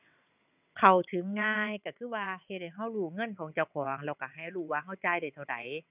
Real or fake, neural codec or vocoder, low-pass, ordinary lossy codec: fake; vocoder, 22.05 kHz, 80 mel bands, WaveNeXt; 3.6 kHz; none